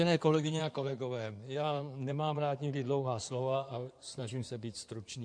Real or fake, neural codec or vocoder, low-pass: fake; codec, 16 kHz in and 24 kHz out, 2.2 kbps, FireRedTTS-2 codec; 9.9 kHz